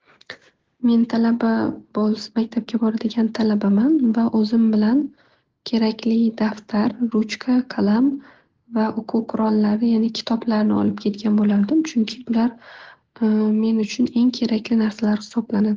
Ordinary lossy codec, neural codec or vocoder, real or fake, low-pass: Opus, 16 kbps; none; real; 7.2 kHz